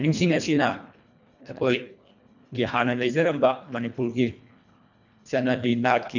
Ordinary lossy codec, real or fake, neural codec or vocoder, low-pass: none; fake; codec, 24 kHz, 1.5 kbps, HILCodec; 7.2 kHz